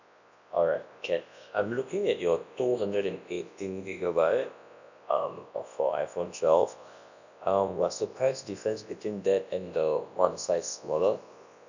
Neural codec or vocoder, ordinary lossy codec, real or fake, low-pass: codec, 24 kHz, 0.9 kbps, WavTokenizer, large speech release; none; fake; 7.2 kHz